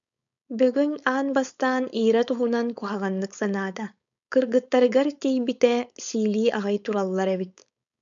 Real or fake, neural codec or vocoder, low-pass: fake; codec, 16 kHz, 4.8 kbps, FACodec; 7.2 kHz